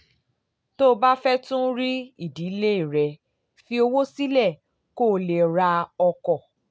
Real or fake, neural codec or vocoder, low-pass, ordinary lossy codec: real; none; none; none